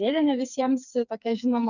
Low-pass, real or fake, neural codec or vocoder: 7.2 kHz; fake; codec, 16 kHz, 4 kbps, FreqCodec, smaller model